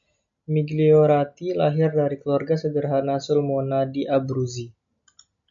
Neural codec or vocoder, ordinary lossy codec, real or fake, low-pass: none; MP3, 64 kbps; real; 7.2 kHz